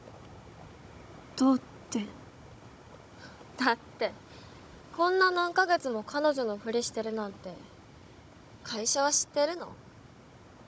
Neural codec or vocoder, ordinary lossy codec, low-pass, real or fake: codec, 16 kHz, 16 kbps, FunCodec, trained on Chinese and English, 50 frames a second; none; none; fake